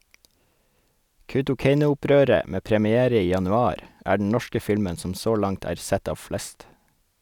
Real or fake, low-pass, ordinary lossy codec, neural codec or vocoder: real; 19.8 kHz; none; none